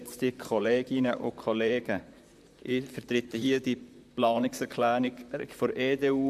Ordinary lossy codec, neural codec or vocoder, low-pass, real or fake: MP3, 96 kbps; vocoder, 44.1 kHz, 128 mel bands, Pupu-Vocoder; 14.4 kHz; fake